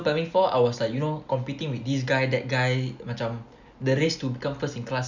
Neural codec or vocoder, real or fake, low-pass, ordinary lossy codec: none; real; 7.2 kHz; none